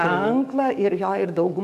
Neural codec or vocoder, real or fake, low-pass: codec, 44.1 kHz, 7.8 kbps, DAC; fake; 14.4 kHz